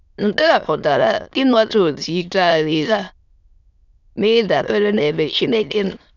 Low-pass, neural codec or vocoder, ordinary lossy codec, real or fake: 7.2 kHz; autoencoder, 22.05 kHz, a latent of 192 numbers a frame, VITS, trained on many speakers; none; fake